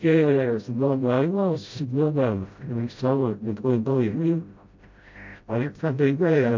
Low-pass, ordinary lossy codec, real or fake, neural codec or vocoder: 7.2 kHz; MP3, 48 kbps; fake; codec, 16 kHz, 0.5 kbps, FreqCodec, smaller model